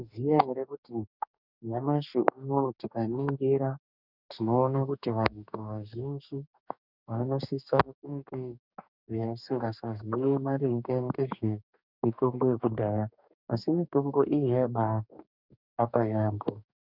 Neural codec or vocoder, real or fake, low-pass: codec, 44.1 kHz, 2.6 kbps, DAC; fake; 5.4 kHz